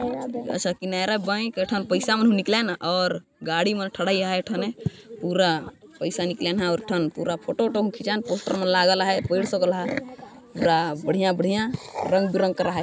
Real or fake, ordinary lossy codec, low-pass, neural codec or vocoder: real; none; none; none